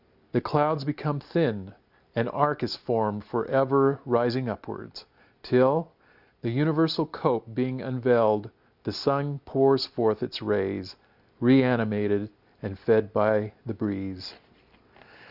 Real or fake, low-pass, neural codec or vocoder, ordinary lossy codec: real; 5.4 kHz; none; Opus, 64 kbps